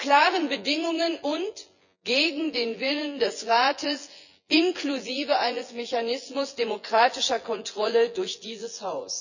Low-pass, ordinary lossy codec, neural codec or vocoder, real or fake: 7.2 kHz; none; vocoder, 24 kHz, 100 mel bands, Vocos; fake